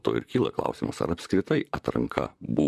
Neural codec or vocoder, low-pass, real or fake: vocoder, 44.1 kHz, 128 mel bands, Pupu-Vocoder; 14.4 kHz; fake